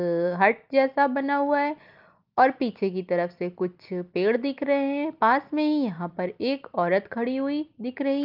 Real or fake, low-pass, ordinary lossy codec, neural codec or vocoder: real; 5.4 kHz; Opus, 32 kbps; none